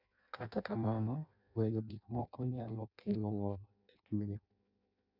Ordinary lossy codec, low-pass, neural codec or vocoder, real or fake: none; 5.4 kHz; codec, 16 kHz in and 24 kHz out, 0.6 kbps, FireRedTTS-2 codec; fake